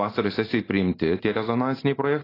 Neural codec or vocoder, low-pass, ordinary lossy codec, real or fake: none; 5.4 kHz; AAC, 24 kbps; real